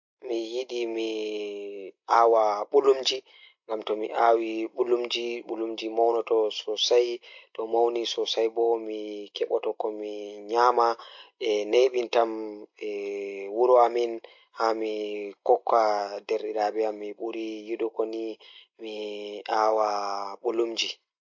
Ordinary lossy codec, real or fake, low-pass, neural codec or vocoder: MP3, 48 kbps; real; 7.2 kHz; none